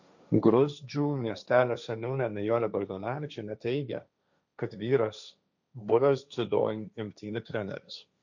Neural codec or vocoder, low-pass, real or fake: codec, 16 kHz, 1.1 kbps, Voila-Tokenizer; 7.2 kHz; fake